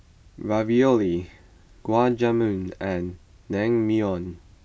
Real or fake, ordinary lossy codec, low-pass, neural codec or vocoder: real; none; none; none